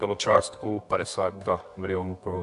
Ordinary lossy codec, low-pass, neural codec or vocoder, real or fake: AAC, 64 kbps; 10.8 kHz; codec, 24 kHz, 0.9 kbps, WavTokenizer, medium music audio release; fake